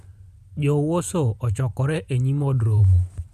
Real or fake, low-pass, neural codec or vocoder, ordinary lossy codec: fake; 14.4 kHz; vocoder, 44.1 kHz, 128 mel bands every 512 samples, BigVGAN v2; none